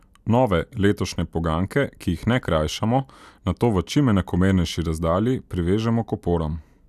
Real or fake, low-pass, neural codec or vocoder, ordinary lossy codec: real; 14.4 kHz; none; none